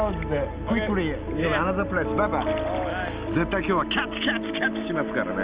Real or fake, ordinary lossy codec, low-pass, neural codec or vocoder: real; Opus, 32 kbps; 3.6 kHz; none